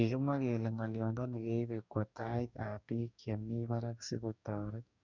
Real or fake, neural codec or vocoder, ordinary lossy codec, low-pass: fake; codec, 44.1 kHz, 2.6 kbps, DAC; none; 7.2 kHz